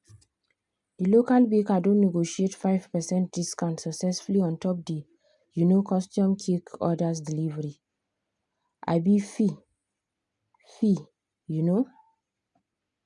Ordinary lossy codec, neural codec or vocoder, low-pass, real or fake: none; none; 10.8 kHz; real